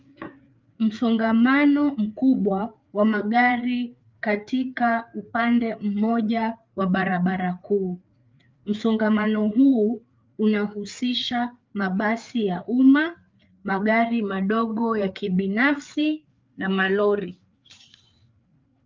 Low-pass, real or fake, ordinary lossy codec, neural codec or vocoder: 7.2 kHz; fake; Opus, 24 kbps; codec, 16 kHz, 4 kbps, FreqCodec, larger model